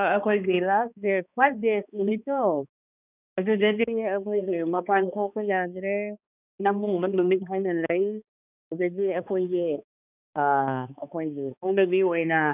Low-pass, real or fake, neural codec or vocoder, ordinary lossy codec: 3.6 kHz; fake; codec, 16 kHz, 2 kbps, X-Codec, HuBERT features, trained on balanced general audio; none